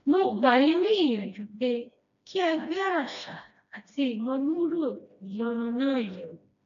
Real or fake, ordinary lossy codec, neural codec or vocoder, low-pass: fake; none; codec, 16 kHz, 1 kbps, FreqCodec, smaller model; 7.2 kHz